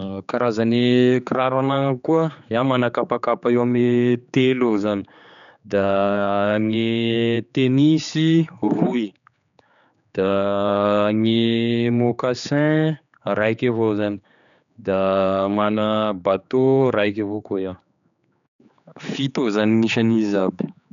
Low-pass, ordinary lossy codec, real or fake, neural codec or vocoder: 7.2 kHz; none; fake; codec, 16 kHz, 4 kbps, X-Codec, HuBERT features, trained on general audio